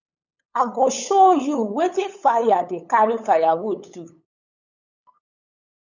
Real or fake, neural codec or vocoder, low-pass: fake; codec, 16 kHz, 8 kbps, FunCodec, trained on LibriTTS, 25 frames a second; 7.2 kHz